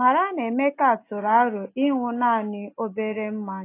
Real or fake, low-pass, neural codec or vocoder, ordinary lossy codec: real; 3.6 kHz; none; AAC, 24 kbps